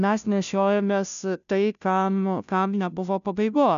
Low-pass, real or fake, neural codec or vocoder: 7.2 kHz; fake; codec, 16 kHz, 0.5 kbps, FunCodec, trained on Chinese and English, 25 frames a second